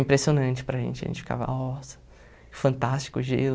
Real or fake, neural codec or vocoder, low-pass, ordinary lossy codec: real; none; none; none